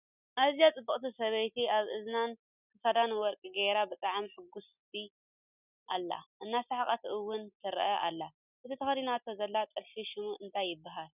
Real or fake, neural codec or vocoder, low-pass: real; none; 3.6 kHz